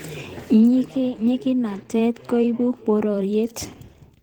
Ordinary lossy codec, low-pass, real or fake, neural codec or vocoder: Opus, 16 kbps; 19.8 kHz; fake; vocoder, 44.1 kHz, 128 mel bands every 512 samples, BigVGAN v2